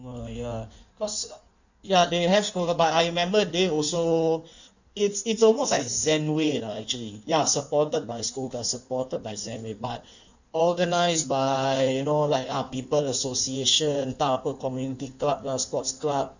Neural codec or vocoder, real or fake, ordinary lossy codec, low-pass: codec, 16 kHz in and 24 kHz out, 1.1 kbps, FireRedTTS-2 codec; fake; none; 7.2 kHz